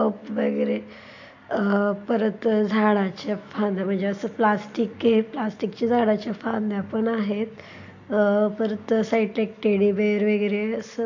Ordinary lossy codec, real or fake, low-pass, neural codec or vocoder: AAC, 48 kbps; real; 7.2 kHz; none